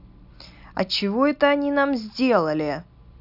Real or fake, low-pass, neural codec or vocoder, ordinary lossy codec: real; 5.4 kHz; none; none